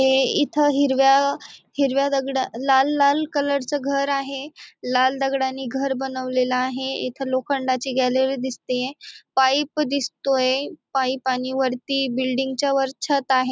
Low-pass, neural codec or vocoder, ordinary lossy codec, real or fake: none; none; none; real